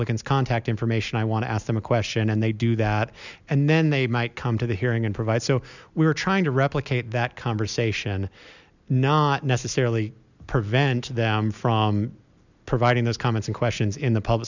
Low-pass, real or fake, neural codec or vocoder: 7.2 kHz; real; none